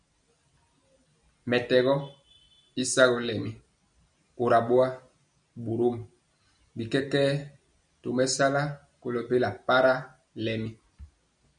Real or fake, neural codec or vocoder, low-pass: real; none; 9.9 kHz